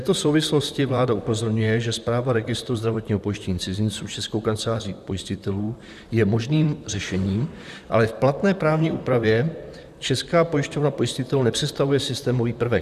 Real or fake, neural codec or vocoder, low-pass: fake; vocoder, 44.1 kHz, 128 mel bands, Pupu-Vocoder; 14.4 kHz